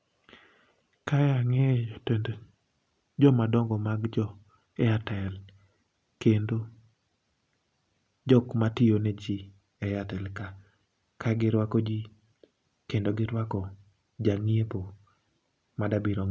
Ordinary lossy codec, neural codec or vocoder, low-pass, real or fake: none; none; none; real